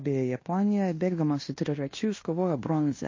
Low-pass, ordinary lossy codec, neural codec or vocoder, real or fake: 7.2 kHz; MP3, 32 kbps; codec, 16 kHz in and 24 kHz out, 0.9 kbps, LongCat-Audio-Codec, fine tuned four codebook decoder; fake